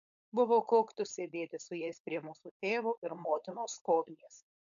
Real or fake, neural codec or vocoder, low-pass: fake; codec, 16 kHz, 4.8 kbps, FACodec; 7.2 kHz